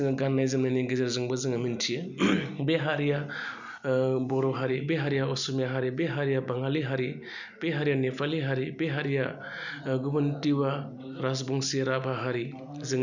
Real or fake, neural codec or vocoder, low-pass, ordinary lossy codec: real; none; 7.2 kHz; none